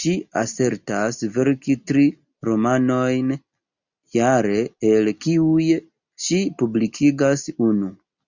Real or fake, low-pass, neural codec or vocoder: real; 7.2 kHz; none